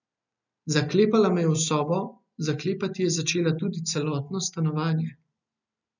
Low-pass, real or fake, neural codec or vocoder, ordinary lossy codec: 7.2 kHz; real; none; none